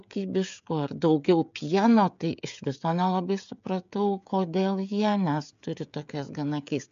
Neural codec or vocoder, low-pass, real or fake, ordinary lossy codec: codec, 16 kHz, 16 kbps, FreqCodec, smaller model; 7.2 kHz; fake; MP3, 64 kbps